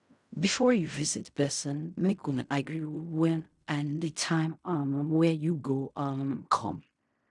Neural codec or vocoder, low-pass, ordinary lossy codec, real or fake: codec, 16 kHz in and 24 kHz out, 0.4 kbps, LongCat-Audio-Codec, fine tuned four codebook decoder; 10.8 kHz; none; fake